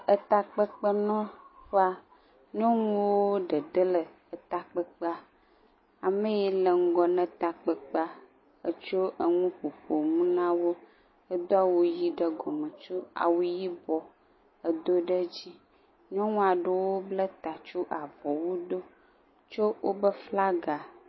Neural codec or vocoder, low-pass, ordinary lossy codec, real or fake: none; 7.2 kHz; MP3, 24 kbps; real